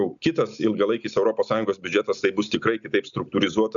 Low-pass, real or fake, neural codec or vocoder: 7.2 kHz; real; none